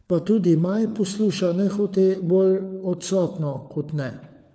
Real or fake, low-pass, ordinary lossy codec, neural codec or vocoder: fake; none; none; codec, 16 kHz, 4 kbps, FunCodec, trained on LibriTTS, 50 frames a second